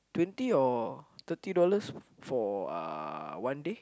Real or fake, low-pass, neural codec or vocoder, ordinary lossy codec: real; none; none; none